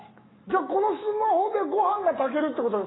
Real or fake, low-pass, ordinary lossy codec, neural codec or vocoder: fake; 7.2 kHz; AAC, 16 kbps; autoencoder, 48 kHz, 128 numbers a frame, DAC-VAE, trained on Japanese speech